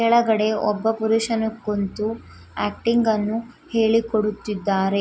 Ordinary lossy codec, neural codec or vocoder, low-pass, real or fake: none; none; none; real